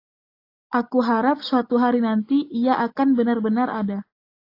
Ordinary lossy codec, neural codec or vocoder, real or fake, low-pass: AAC, 32 kbps; none; real; 5.4 kHz